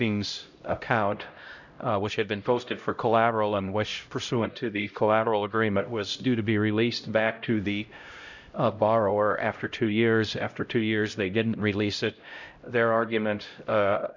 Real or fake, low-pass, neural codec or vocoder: fake; 7.2 kHz; codec, 16 kHz, 0.5 kbps, X-Codec, HuBERT features, trained on LibriSpeech